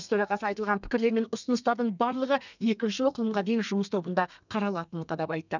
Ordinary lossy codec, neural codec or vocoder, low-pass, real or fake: none; codec, 32 kHz, 1.9 kbps, SNAC; 7.2 kHz; fake